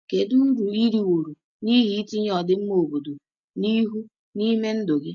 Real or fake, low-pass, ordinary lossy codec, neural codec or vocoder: real; 7.2 kHz; none; none